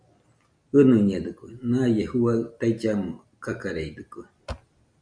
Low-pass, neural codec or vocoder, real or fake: 9.9 kHz; none; real